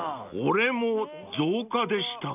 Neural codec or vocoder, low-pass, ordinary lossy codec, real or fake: none; 3.6 kHz; none; real